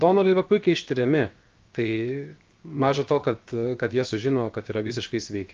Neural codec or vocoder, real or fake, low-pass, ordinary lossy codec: codec, 16 kHz, 0.7 kbps, FocalCodec; fake; 7.2 kHz; Opus, 24 kbps